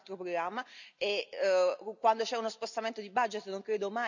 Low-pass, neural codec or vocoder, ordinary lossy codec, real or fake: 7.2 kHz; none; none; real